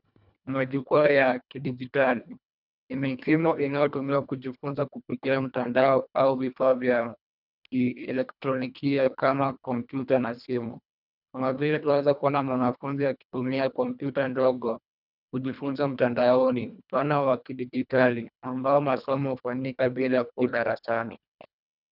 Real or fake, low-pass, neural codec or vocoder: fake; 5.4 kHz; codec, 24 kHz, 1.5 kbps, HILCodec